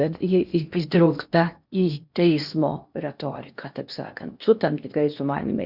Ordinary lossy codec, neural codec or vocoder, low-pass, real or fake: Opus, 64 kbps; codec, 16 kHz in and 24 kHz out, 0.8 kbps, FocalCodec, streaming, 65536 codes; 5.4 kHz; fake